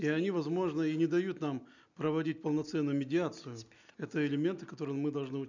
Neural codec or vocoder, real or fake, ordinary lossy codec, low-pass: none; real; none; 7.2 kHz